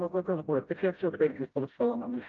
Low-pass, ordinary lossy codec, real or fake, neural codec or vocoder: 7.2 kHz; Opus, 32 kbps; fake; codec, 16 kHz, 0.5 kbps, FreqCodec, smaller model